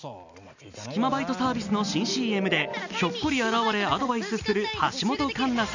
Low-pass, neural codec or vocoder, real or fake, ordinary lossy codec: 7.2 kHz; none; real; none